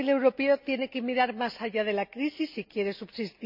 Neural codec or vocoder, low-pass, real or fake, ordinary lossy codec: none; 5.4 kHz; real; none